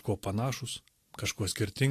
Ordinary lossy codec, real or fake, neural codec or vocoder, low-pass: AAC, 64 kbps; real; none; 14.4 kHz